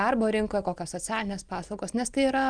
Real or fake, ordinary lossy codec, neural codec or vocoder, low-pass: real; Opus, 32 kbps; none; 9.9 kHz